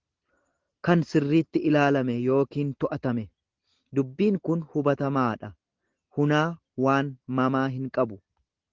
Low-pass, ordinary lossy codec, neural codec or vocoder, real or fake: 7.2 kHz; Opus, 16 kbps; none; real